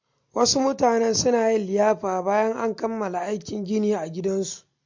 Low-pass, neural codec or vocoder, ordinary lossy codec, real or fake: 7.2 kHz; none; MP3, 48 kbps; real